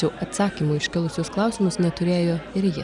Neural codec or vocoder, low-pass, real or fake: none; 10.8 kHz; real